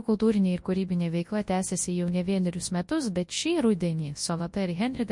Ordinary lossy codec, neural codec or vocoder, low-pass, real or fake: MP3, 48 kbps; codec, 24 kHz, 0.9 kbps, WavTokenizer, large speech release; 10.8 kHz; fake